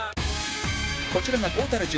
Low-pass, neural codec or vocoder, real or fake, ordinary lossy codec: none; codec, 16 kHz, 6 kbps, DAC; fake; none